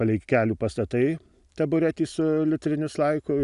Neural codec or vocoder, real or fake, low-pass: none; real; 10.8 kHz